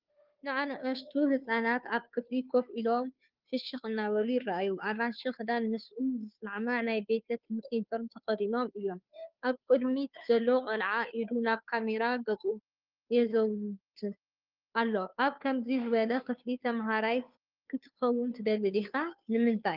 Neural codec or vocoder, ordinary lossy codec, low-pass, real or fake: codec, 16 kHz, 2 kbps, FunCodec, trained on Chinese and English, 25 frames a second; Opus, 24 kbps; 5.4 kHz; fake